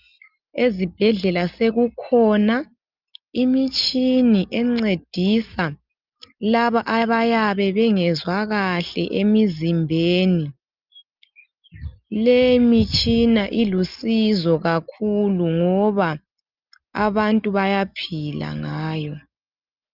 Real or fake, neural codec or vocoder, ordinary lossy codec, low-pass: real; none; Opus, 24 kbps; 5.4 kHz